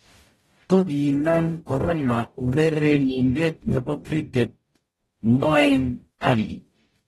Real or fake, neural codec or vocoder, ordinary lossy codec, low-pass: fake; codec, 44.1 kHz, 0.9 kbps, DAC; AAC, 32 kbps; 19.8 kHz